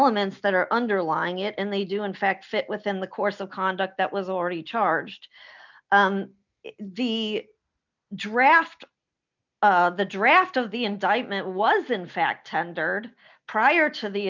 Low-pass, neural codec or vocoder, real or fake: 7.2 kHz; none; real